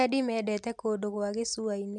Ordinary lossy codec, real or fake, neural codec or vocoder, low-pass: MP3, 96 kbps; real; none; 10.8 kHz